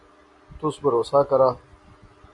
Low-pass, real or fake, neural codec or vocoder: 10.8 kHz; real; none